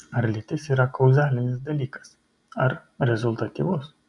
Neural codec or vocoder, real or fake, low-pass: none; real; 10.8 kHz